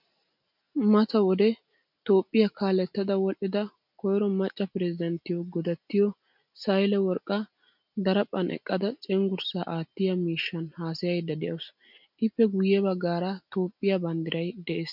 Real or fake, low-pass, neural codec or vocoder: real; 5.4 kHz; none